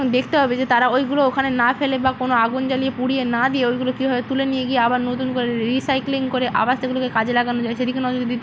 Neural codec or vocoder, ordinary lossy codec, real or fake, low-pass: none; none; real; none